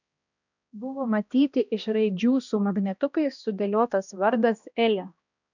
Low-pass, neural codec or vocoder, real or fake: 7.2 kHz; codec, 16 kHz, 1 kbps, X-Codec, HuBERT features, trained on balanced general audio; fake